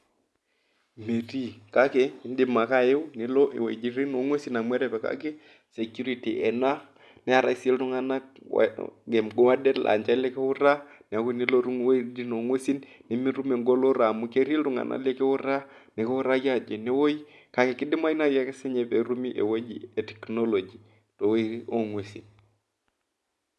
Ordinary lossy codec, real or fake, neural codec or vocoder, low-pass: none; real; none; none